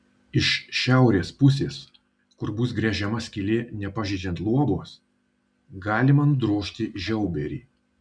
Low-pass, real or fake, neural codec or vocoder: 9.9 kHz; real; none